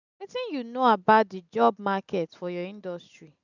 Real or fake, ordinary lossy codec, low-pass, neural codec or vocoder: real; none; 7.2 kHz; none